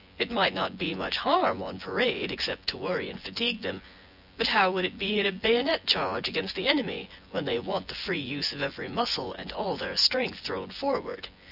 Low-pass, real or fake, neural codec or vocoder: 5.4 kHz; fake; vocoder, 24 kHz, 100 mel bands, Vocos